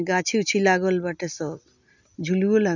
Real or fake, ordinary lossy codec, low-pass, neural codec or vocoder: real; none; 7.2 kHz; none